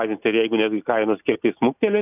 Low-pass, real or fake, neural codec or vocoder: 3.6 kHz; real; none